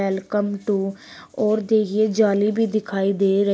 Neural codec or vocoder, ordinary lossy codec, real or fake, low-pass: none; none; real; none